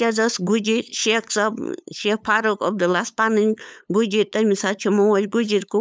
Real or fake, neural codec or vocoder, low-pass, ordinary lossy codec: fake; codec, 16 kHz, 8 kbps, FunCodec, trained on LibriTTS, 25 frames a second; none; none